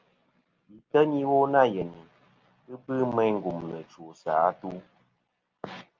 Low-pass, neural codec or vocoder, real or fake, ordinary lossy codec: 7.2 kHz; none; real; Opus, 24 kbps